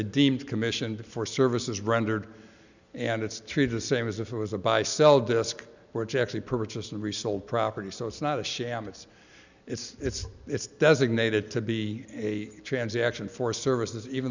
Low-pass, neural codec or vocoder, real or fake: 7.2 kHz; none; real